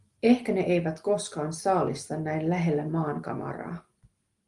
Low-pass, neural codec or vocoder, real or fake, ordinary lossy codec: 10.8 kHz; none; real; Opus, 24 kbps